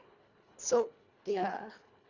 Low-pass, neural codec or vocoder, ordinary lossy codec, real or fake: 7.2 kHz; codec, 24 kHz, 1.5 kbps, HILCodec; none; fake